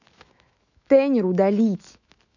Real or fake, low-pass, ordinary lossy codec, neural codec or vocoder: fake; 7.2 kHz; none; autoencoder, 48 kHz, 128 numbers a frame, DAC-VAE, trained on Japanese speech